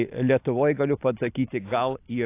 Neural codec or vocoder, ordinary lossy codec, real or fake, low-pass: none; AAC, 24 kbps; real; 3.6 kHz